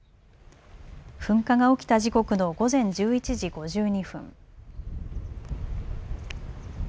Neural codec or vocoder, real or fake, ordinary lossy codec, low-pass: none; real; none; none